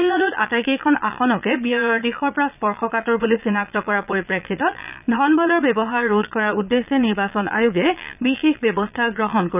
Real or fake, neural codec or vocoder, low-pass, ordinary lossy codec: fake; vocoder, 22.05 kHz, 80 mel bands, Vocos; 3.6 kHz; none